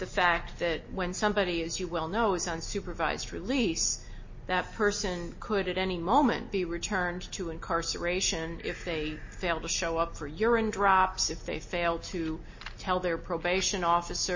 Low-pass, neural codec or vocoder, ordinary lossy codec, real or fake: 7.2 kHz; none; MP3, 32 kbps; real